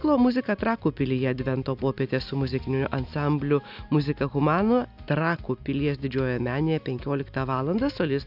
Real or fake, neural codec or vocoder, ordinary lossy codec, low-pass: real; none; AAC, 48 kbps; 5.4 kHz